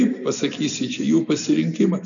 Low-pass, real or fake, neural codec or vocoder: 7.2 kHz; real; none